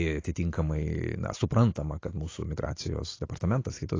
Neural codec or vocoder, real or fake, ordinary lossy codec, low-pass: none; real; AAC, 32 kbps; 7.2 kHz